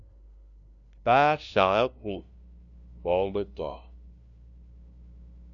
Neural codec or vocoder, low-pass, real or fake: codec, 16 kHz, 0.5 kbps, FunCodec, trained on LibriTTS, 25 frames a second; 7.2 kHz; fake